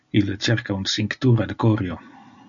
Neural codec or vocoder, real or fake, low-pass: none; real; 7.2 kHz